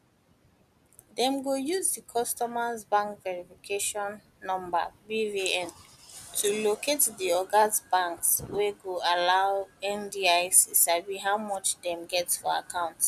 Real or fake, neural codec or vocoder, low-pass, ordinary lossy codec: real; none; 14.4 kHz; none